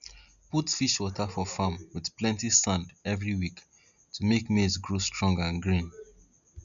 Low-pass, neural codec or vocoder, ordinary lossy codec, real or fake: 7.2 kHz; none; none; real